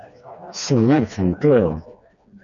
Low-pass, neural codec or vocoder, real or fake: 7.2 kHz; codec, 16 kHz, 2 kbps, FreqCodec, smaller model; fake